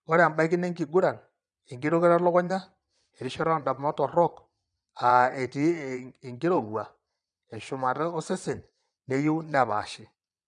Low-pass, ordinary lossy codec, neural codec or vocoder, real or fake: 9.9 kHz; none; vocoder, 22.05 kHz, 80 mel bands, Vocos; fake